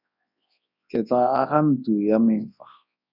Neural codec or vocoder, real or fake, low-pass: codec, 24 kHz, 0.9 kbps, WavTokenizer, large speech release; fake; 5.4 kHz